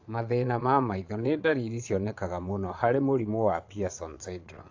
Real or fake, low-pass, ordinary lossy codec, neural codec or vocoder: fake; 7.2 kHz; AAC, 48 kbps; vocoder, 22.05 kHz, 80 mel bands, Vocos